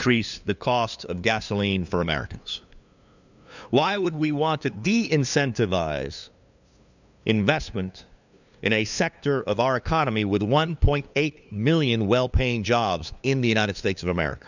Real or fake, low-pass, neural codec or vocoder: fake; 7.2 kHz; codec, 16 kHz, 2 kbps, FunCodec, trained on LibriTTS, 25 frames a second